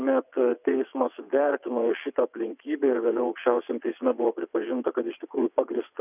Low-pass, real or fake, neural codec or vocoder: 3.6 kHz; fake; vocoder, 22.05 kHz, 80 mel bands, WaveNeXt